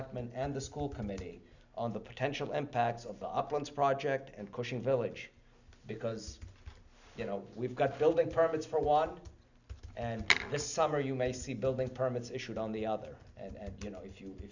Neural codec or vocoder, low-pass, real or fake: none; 7.2 kHz; real